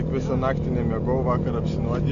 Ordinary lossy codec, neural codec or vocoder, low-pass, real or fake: AAC, 64 kbps; none; 7.2 kHz; real